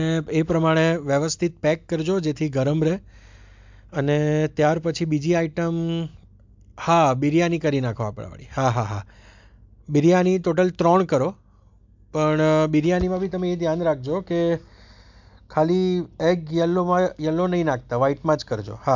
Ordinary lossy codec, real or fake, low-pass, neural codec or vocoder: MP3, 64 kbps; real; 7.2 kHz; none